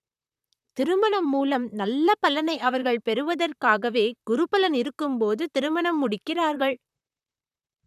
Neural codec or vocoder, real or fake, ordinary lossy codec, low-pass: vocoder, 44.1 kHz, 128 mel bands, Pupu-Vocoder; fake; none; 14.4 kHz